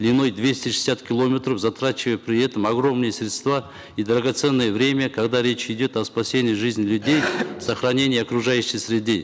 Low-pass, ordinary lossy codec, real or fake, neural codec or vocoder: none; none; real; none